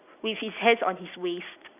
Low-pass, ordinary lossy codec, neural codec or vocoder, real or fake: 3.6 kHz; none; none; real